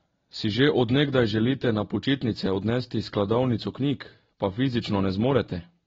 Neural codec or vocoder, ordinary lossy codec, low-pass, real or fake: none; AAC, 24 kbps; 7.2 kHz; real